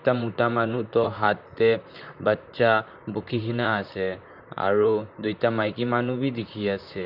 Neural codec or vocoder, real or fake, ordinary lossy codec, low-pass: vocoder, 44.1 kHz, 128 mel bands, Pupu-Vocoder; fake; none; 5.4 kHz